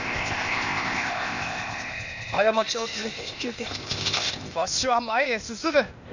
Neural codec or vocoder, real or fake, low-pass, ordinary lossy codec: codec, 16 kHz, 0.8 kbps, ZipCodec; fake; 7.2 kHz; none